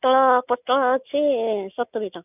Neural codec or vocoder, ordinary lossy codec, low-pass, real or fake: none; none; 3.6 kHz; real